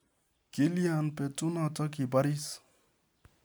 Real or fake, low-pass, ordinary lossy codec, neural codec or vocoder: real; none; none; none